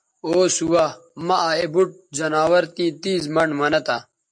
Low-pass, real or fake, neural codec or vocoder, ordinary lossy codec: 9.9 kHz; real; none; MP3, 48 kbps